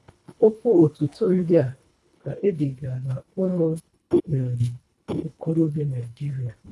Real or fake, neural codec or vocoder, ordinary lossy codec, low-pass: fake; codec, 24 kHz, 1.5 kbps, HILCodec; none; none